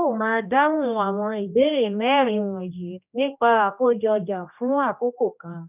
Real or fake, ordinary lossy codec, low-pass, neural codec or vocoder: fake; none; 3.6 kHz; codec, 16 kHz, 1 kbps, X-Codec, HuBERT features, trained on general audio